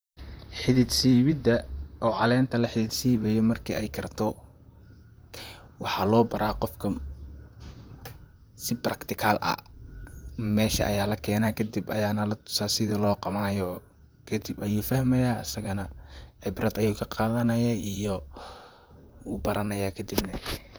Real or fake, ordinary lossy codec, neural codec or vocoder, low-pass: fake; none; vocoder, 44.1 kHz, 128 mel bands, Pupu-Vocoder; none